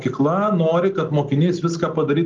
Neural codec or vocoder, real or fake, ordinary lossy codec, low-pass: none; real; Opus, 32 kbps; 7.2 kHz